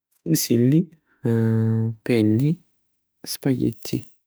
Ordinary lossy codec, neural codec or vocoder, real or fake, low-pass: none; autoencoder, 48 kHz, 32 numbers a frame, DAC-VAE, trained on Japanese speech; fake; none